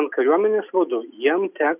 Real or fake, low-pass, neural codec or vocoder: real; 3.6 kHz; none